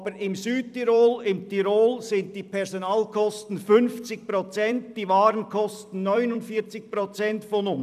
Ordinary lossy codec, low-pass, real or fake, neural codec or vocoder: none; 14.4 kHz; fake; vocoder, 44.1 kHz, 128 mel bands every 256 samples, BigVGAN v2